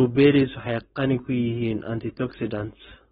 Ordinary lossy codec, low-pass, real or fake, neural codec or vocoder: AAC, 16 kbps; 7.2 kHz; fake; codec, 16 kHz, 4.8 kbps, FACodec